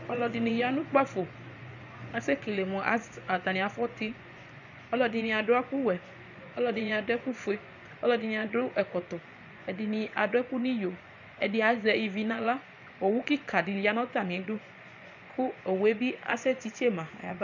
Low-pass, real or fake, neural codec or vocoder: 7.2 kHz; fake; vocoder, 24 kHz, 100 mel bands, Vocos